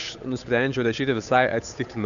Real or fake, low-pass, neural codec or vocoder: fake; 7.2 kHz; codec, 16 kHz, 8 kbps, FunCodec, trained on Chinese and English, 25 frames a second